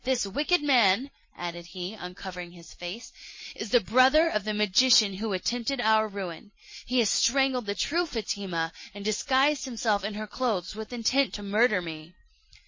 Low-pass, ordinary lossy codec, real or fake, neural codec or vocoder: 7.2 kHz; MP3, 32 kbps; fake; vocoder, 44.1 kHz, 128 mel bands every 256 samples, BigVGAN v2